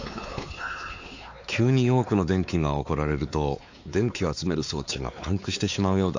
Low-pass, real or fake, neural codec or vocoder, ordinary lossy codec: 7.2 kHz; fake; codec, 16 kHz, 4 kbps, X-Codec, WavLM features, trained on Multilingual LibriSpeech; AAC, 48 kbps